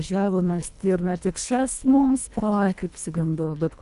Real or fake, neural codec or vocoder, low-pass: fake; codec, 24 kHz, 1.5 kbps, HILCodec; 10.8 kHz